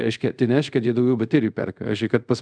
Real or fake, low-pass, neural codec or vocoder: fake; 9.9 kHz; codec, 24 kHz, 0.5 kbps, DualCodec